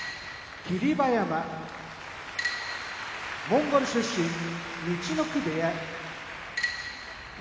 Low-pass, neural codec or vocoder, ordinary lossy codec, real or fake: none; none; none; real